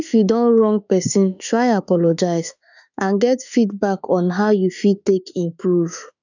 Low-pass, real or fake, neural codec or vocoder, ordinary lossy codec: 7.2 kHz; fake; autoencoder, 48 kHz, 32 numbers a frame, DAC-VAE, trained on Japanese speech; none